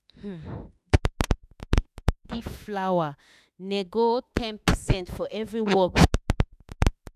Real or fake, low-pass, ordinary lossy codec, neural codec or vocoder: fake; 14.4 kHz; none; autoencoder, 48 kHz, 32 numbers a frame, DAC-VAE, trained on Japanese speech